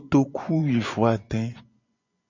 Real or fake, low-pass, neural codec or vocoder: fake; 7.2 kHz; vocoder, 24 kHz, 100 mel bands, Vocos